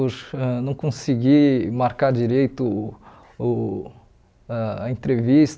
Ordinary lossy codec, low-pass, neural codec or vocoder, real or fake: none; none; none; real